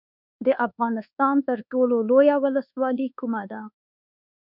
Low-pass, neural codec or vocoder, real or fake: 5.4 kHz; codec, 24 kHz, 1.2 kbps, DualCodec; fake